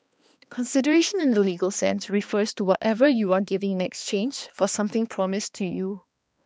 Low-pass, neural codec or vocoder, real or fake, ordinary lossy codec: none; codec, 16 kHz, 2 kbps, X-Codec, HuBERT features, trained on balanced general audio; fake; none